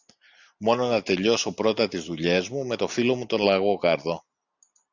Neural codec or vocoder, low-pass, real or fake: none; 7.2 kHz; real